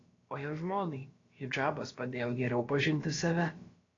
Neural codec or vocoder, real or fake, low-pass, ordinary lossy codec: codec, 16 kHz, about 1 kbps, DyCAST, with the encoder's durations; fake; 7.2 kHz; AAC, 32 kbps